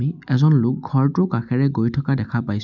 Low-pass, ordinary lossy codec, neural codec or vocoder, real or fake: 7.2 kHz; none; none; real